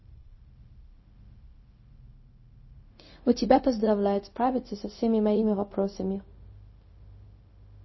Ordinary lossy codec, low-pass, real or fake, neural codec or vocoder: MP3, 24 kbps; 7.2 kHz; fake; codec, 16 kHz, 0.4 kbps, LongCat-Audio-Codec